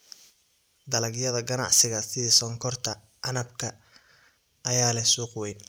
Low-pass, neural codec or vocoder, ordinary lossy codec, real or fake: none; none; none; real